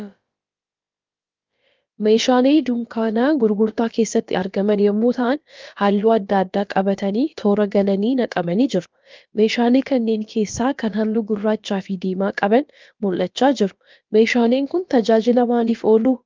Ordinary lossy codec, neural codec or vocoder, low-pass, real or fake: Opus, 24 kbps; codec, 16 kHz, about 1 kbps, DyCAST, with the encoder's durations; 7.2 kHz; fake